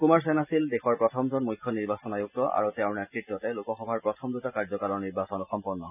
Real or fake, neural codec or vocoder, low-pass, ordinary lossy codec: real; none; 3.6 kHz; none